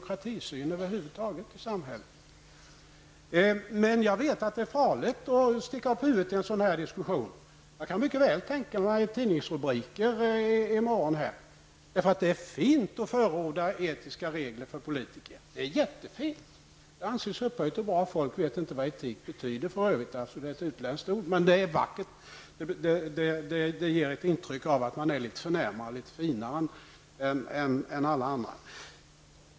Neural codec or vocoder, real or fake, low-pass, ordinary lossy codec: none; real; none; none